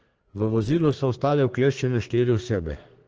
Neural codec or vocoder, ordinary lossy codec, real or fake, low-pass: codec, 32 kHz, 1.9 kbps, SNAC; Opus, 16 kbps; fake; 7.2 kHz